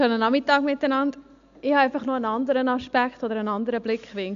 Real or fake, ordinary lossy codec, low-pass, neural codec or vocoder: real; AAC, 64 kbps; 7.2 kHz; none